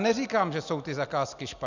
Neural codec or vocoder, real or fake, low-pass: none; real; 7.2 kHz